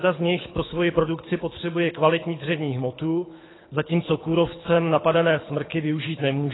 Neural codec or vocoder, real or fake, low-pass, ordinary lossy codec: codec, 24 kHz, 6 kbps, HILCodec; fake; 7.2 kHz; AAC, 16 kbps